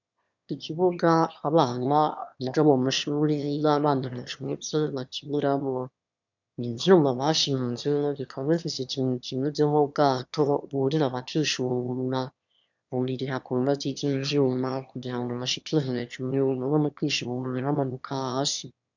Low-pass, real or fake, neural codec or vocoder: 7.2 kHz; fake; autoencoder, 22.05 kHz, a latent of 192 numbers a frame, VITS, trained on one speaker